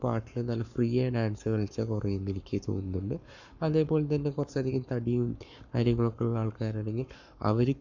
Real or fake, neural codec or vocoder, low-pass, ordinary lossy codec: fake; codec, 44.1 kHz, 7.8 kbps, Pupu-Codec; 7.2 kHz; none